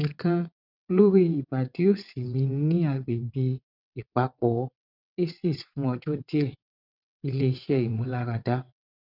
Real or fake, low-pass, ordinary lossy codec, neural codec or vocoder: fake; 5.4 kHz; none; vocoder, 22.05 kHz, 80 mel bands, WaveNeXt